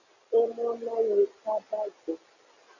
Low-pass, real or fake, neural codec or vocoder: 7.2 kHz; real; none